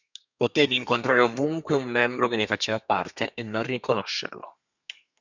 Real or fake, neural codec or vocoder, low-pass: fake; codec, 32 kHz, 1.9 kbps, SNAC; 7.2 kHz